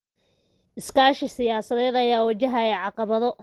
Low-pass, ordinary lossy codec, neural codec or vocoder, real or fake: 19.8 kHz; Opus, 16 kbps; none; real